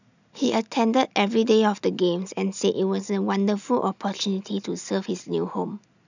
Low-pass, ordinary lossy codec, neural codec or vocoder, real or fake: 7.2 kHz; none; none; real